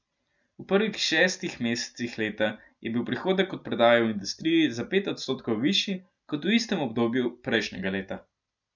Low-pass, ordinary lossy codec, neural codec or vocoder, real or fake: 7.2 kHz; none; none; real